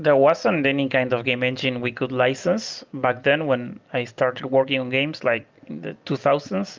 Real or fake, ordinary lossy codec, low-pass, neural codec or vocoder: real; Opus, 32 kbps; 7.2 kHz; none